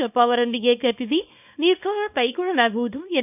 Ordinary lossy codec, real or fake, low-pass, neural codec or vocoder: none; fake; 3.6 kHz; codec, 24 kHz, 0.9 kbps, WavTokenizer, small release